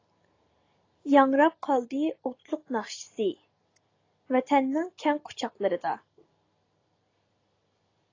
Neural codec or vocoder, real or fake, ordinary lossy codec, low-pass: none; real; AAC, 32 kbps; 7.2 kHz